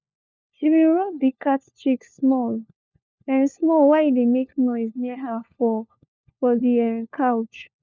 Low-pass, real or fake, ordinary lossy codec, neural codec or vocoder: none; fake; none; codec, 16 kHz, 4 kbps, FunCodec, trained on LibriTTS, 50 frames a second